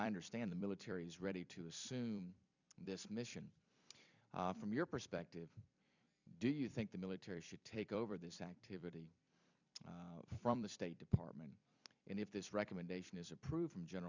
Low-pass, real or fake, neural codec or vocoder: 7.2 kHz; fake; vocoder, 44.1 kHz, 128 mel bands every 256 samples, BigVGAN v2